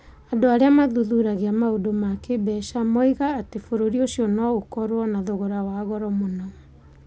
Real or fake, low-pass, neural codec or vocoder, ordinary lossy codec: real; none; none; none